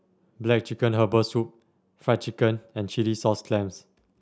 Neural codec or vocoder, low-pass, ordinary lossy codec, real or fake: none; none; none; real